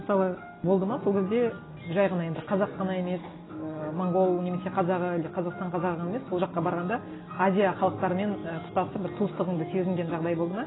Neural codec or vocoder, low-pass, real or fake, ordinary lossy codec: none; 7.2 kHz; real; AAC, 16 kbps